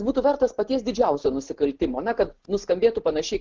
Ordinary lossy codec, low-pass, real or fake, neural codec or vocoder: Opus, 16 kbps; 7.2 kHz; real; none